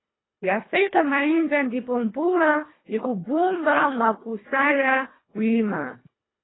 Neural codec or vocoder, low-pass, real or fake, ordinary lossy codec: codec, 24 kHz, 1.5 kbps, HILCodec; 7.2 kHz; fake; AAC, 16 kbps